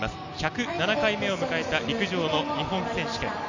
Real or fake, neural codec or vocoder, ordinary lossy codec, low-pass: real; none; none; 7.2 kHz